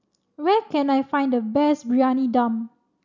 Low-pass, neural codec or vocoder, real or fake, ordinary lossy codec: 7.2 kHz; none; real; none